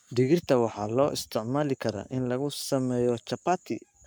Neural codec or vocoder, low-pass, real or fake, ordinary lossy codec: codec, 44.1 kHz, 7.8 kbps, Pupu-Codec; none; fake; none